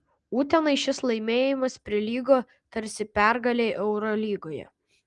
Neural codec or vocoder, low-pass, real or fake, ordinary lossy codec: none; 10.8 kHz; real; Opus, 24 kbps